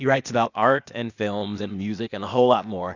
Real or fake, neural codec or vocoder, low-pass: fake; codec, 16 kHz, 0.8 kbps, ZipCodec; 7.2 kHz